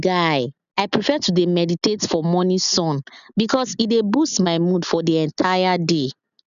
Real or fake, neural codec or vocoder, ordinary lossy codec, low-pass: real; none; none; 7.2 kHz